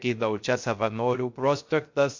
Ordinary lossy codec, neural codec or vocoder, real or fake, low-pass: MP3, 48 kbps; codec, 16 kHz, 0.3 kbps, FocalCodec; fake; 7.2 kHz